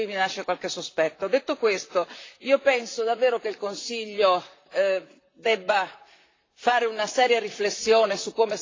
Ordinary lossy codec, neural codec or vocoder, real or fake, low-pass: AAC, 32 kbps; vocoder, 44.1 kHz, 128 mel bands, Pupu-Vocoder; fake; 7.2 kHz